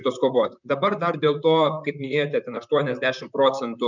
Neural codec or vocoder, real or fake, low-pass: vocoder, 44.1 kHz, 128 mel bands, Pupu-Vocoder; fake; 7.2 kHz